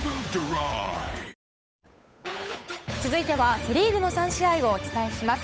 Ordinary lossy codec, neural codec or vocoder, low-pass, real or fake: none; codec, 16 kHz, 8 kbps, FunCodec, trained on Chinese and English, 25 frames a second; none; fake